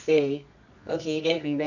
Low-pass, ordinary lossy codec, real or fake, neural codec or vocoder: 7.2 kHz; none; fake; codec, 24 kHz, 0.9 kbps, WavTokenizer, medium music audio release